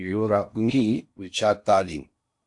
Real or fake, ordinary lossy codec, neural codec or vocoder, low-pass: fake; AAC, 64 kbps; codec, 16 kHz in and 24 kHz out, 0.6 kbps, FocalCodec, streaming, 4096 codes; 10.8 kHz